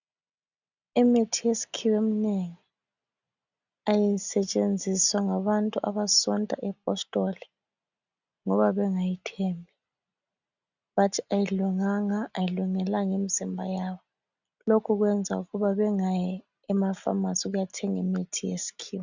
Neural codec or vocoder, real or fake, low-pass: none; real; 7.2 kHz